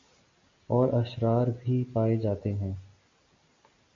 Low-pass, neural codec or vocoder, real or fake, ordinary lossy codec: 7.2 kHz; none; real; MP3, 48 kbps